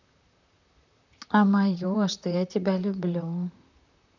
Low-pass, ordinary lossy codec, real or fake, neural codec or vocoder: 7.2 kHz; none; fake; vocoder, 44.1 kHz, 128 mel bands, Pupu-Vocoder